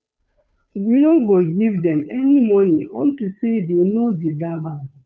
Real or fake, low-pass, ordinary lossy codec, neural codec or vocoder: fake; none; none; codec, 16 kHz, 2 kbps, FunCodec, trained on Chinese and English, 25 frames a second